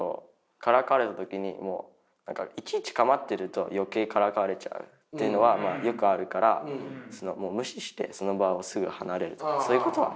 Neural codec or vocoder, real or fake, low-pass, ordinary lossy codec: none; real; none; none